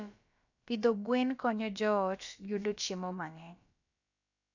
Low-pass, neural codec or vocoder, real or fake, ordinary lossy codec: 7.2 kHz; codec, 16 kHz, about 1 kbps, DyCAST, with the encoder's durations; fake; none